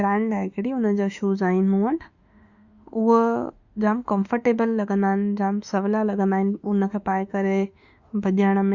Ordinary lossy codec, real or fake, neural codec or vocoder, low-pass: Opus, 64 kbps; fake; autoencoder, 48 kHz, 32 numbers a frame, DAC-VAE, trained on Japanese speech; 7.2 kHz